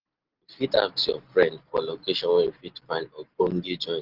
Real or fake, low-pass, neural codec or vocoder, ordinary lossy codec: real; 5.4 kHz; none; Opus, 16 kbps